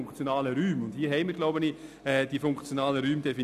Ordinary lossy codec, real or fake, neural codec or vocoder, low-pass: none; real; none; 14.4 kHz